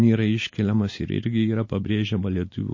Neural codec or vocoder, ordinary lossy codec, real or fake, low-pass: none; MP3, 32 kbps; real; 7.2 kHz